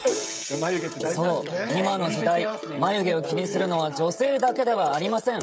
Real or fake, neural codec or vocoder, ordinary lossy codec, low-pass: fake; codec, 16 kHz, 16 kbps, FreqCodec, smaller model; none; none